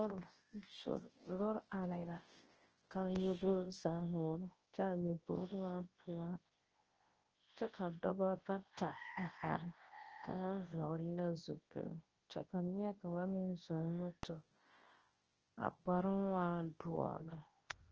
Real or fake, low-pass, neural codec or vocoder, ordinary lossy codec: fake; 7.2 kHz; codec, 24 kHz, 0.9 kbps, WavTokenizer, large speech release; Opus, 16 kbps